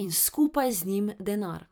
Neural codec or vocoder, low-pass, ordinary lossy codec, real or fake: vocoder, 44.1 kHz, 128 mel bands every 256 samples, BigVGAN v2; none; none; fake